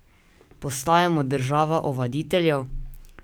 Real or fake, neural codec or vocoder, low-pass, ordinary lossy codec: fake; codec, 44.1 kHz, 7.8 kbps, DAC; none; none